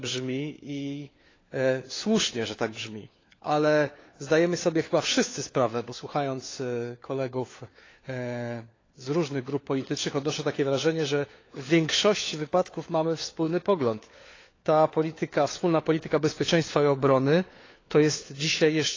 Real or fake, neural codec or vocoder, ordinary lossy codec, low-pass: fake; codec, 16 kHz, 4 kbps, FunCodec, trained on LibriTTS, 50 frames a second; AAC, 32 kbps; 7.2 kHz